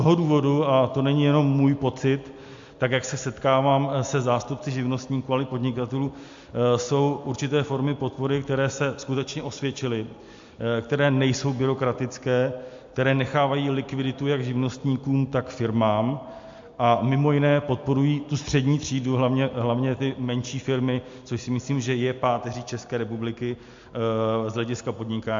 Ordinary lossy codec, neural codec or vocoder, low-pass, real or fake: MP3, 48 kbps; none; 7.2 kHz; real